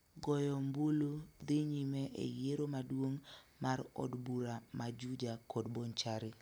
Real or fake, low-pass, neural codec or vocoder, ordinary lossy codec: real; none; none; none